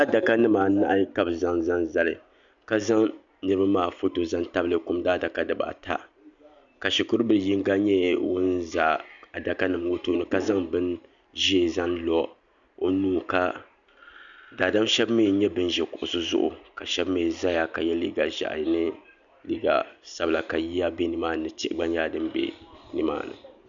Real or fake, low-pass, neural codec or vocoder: real; 7.2 kHz; none